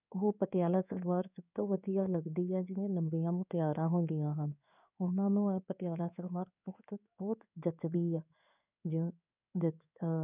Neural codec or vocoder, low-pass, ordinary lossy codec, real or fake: codec, 16 kHz in and 24 kHz out, 1 kbps, XY-Tokenizer; 3.6 kHz; none; fake